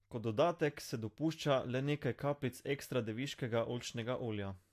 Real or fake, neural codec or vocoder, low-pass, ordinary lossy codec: real; none; 14.4 kHz; AAC, 64 kbps